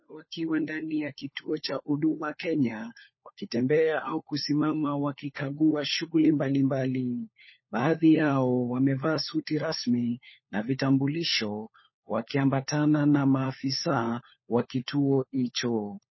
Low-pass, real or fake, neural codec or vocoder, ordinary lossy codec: 7.2 kHz; fake; codec, 16 kHz, 4 kbps, FunCodec, trained on LibriTTS, 50 frames a second; MP3, 24 kbps